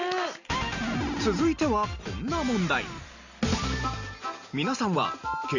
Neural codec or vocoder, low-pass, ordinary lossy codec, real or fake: none; 7.2 kHz; none; real